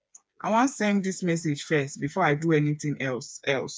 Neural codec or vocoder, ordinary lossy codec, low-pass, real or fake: codec, 16 kHz, 4 kbps, FreqCodec, smaller model; none; none; fake